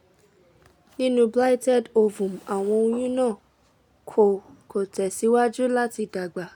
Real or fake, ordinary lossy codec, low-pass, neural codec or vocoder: real; none; 19.8 kHz; none